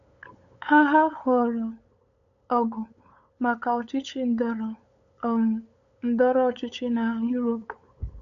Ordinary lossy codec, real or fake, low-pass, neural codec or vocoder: none; fake; 7.2 kHz; codec, 16 kHz, 8 kbps, FunCodec, trained on LibriTTS, 25 frames a second